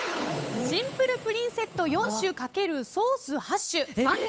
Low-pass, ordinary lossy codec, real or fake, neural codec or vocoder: none; none; fake; codec, 16 kHz, 8 kbps, FunCodec, trained on Chinese and English, 25 frames a second